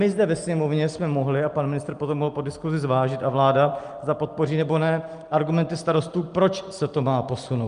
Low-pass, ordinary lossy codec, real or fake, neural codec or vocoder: 9.9 kHz; Opus, 32 kbps; real; none